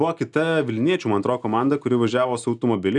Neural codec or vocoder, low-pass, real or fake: none; 10.8 kHz; real